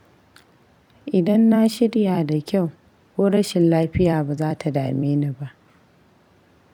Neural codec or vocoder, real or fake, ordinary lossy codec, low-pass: vocoder, 44.1 kHz, 128 mel bands every 256 samples, BigVGAN v2; fake; none; 19.8 kHz